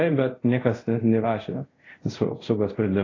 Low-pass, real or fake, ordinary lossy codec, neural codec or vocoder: 7.2 kHz; fake; AAC, 32 kbps; codec, 24 kHz, 0.5 kbps, DualCodec